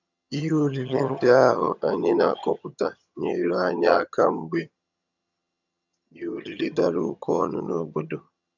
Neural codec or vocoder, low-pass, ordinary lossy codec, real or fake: vocoder, 22.05 kHz, 80 mel bands, HiFi-GAN; 7.2 kHz; none; fake